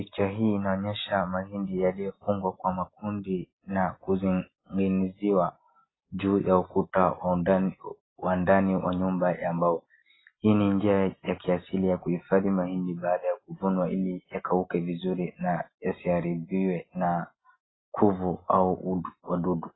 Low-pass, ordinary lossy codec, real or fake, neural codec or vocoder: 7.2 kHz; AAC, 16 kbps; real; none